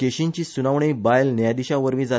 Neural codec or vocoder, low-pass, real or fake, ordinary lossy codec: none; none; real; none